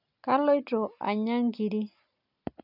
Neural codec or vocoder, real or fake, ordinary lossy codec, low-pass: none; real; none; 5.4 kHz